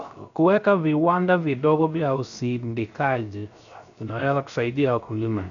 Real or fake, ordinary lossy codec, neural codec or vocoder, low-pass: fake; MP3, 96 kbps; codec, 16 kHz, 0.3 kbps, FocalCodec; 7.2 kHz